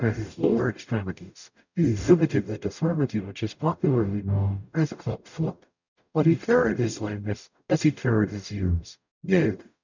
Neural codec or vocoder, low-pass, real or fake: codec, 44.1 kHz, 0.9 kbps, DAC; 7.2 kHz; fake